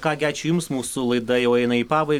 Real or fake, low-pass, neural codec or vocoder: real; 19.8 kHz; none